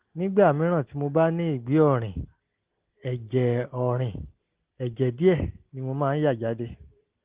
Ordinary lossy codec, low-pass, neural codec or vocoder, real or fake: Opus, 16 kbps; 3.6 kHz; none; real